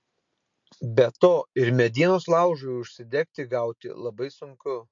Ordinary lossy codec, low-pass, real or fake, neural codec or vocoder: MP3, 48 kbps; 7.2 kHz; real; none